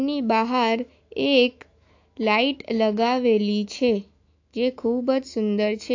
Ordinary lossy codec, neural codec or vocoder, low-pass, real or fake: AAC, 48 kbps; autoencoder, 48 kHz, 128 numbers a frame, DAC-VAE, trained on Japanese speech; 7.2 kHz; fake